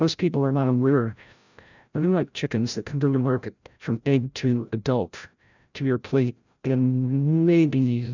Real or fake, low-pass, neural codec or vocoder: fake; 7.2 kHz; codec, 16 kHz, 0.5 kbps, FreqCodec, larger model